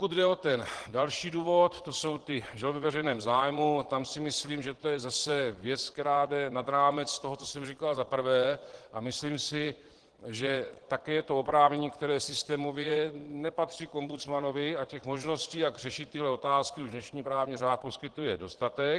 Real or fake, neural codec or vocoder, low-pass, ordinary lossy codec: fake; vocoder, 22.05 kHz, 80 mel bands, Vocos; 9.9 kHz; Opus, 16 kbps